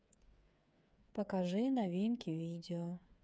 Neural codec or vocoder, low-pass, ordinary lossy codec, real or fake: codec, 16 kHz, 8 kbps, FreqCodec, smaller model; none; none; fake